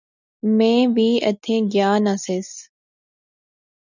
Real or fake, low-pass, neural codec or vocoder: real; 7.2 kHz; none